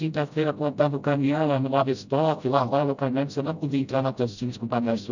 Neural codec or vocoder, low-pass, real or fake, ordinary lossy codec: codec, 16 kHz, 0.5 kbps, FreqCodec, smaller model; 7.2 kHz; fake; none